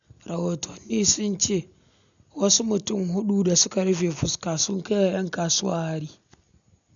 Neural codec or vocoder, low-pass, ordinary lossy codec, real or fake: none; 7.2 kHz; none; real